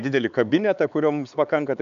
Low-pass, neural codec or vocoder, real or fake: 7.2 kHz; codec, 16 kHz, 4 kbps, X-Codec, HuBERT features, trained on LibriSpeech; fake